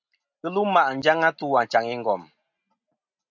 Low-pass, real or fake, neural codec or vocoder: 7.2 kHz; real; none